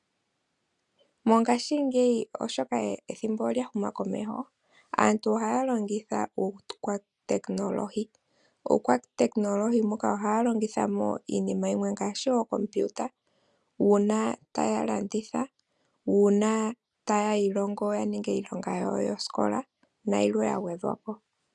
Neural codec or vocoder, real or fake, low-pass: none; real; 10.8 kHz